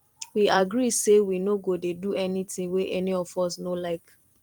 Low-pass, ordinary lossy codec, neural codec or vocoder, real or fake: 19.8 kHz; Opus, 24 kbps; vocoder, 44.1 kHz, 128 mel bands every 256 samples, BigVGAN v2; fake